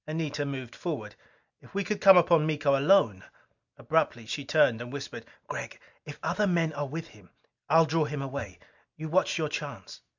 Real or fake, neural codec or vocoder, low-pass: real; none; 7.2 kHz